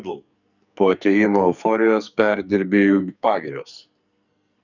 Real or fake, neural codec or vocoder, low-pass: fake; codec, 44.1 kHz, 2.6 kbps, SNAC; 7.2 kHz